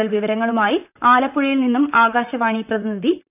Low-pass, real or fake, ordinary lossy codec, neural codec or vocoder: 3.6 kHz; fake; none; vocoder, 44.1 kHz, 128 mel bands, Pupu-Vocoder